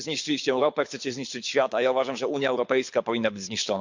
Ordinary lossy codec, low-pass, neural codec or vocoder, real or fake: MP3, 64 kbps; 7.2 kHz; codec, 24 kHz, 6 kbps, HILCodec; fake